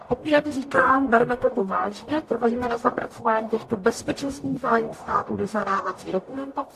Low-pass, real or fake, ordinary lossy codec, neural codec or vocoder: 14.4 kHz; fake; AAC, 64 kbps; codec, 44.1 kHz, 0.9 kbps, DAC